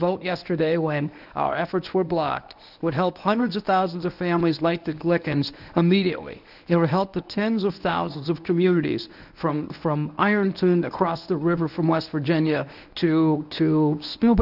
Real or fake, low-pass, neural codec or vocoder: fake; 5.4 kHz; codec, 24 kHz, 0.9 kbps, WavTokenizer, medium speech release version 1